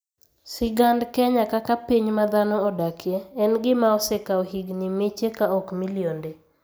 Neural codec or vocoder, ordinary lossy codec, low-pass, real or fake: none; none; none; real